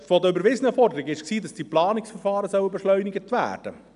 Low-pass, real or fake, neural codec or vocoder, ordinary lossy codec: 10.8 kHz; real; none; none